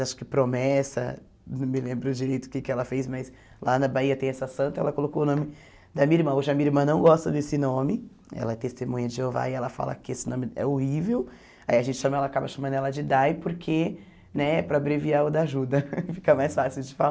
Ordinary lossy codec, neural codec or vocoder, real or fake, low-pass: none; none; real; none